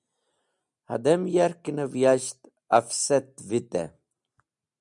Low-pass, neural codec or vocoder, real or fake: 10.8 kHz; none; real